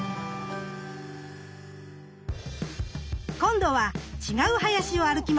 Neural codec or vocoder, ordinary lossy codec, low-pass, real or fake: none; none; none; real